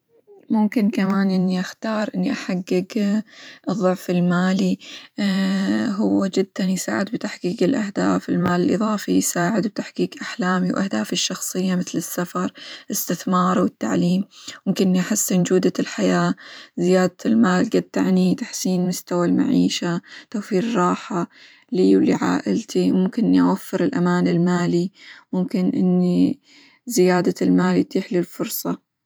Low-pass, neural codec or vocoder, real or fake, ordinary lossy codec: none; vocoder, 44.1 kHz, 128 mel bands every 512 samples, BigVGAN v2; fake; none